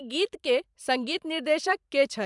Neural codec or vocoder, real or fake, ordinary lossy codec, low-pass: vocoder, 44.1 kHz, 128 mel bands every 512 samples, BigVGAN v2; fake; MP3, 96 kbps; 10.8 kHz